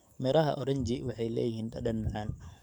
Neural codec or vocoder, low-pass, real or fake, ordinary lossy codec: codec, 44.1 kHz, 7.8 kbps, DAC; 19.8 kHz; fake; none